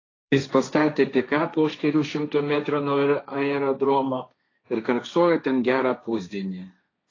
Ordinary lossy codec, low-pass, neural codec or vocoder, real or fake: AAC, 32 kbps; 7.2 kHz; codec, 16 kHz, 1.1 kbps, Voila-Tokenizer; fake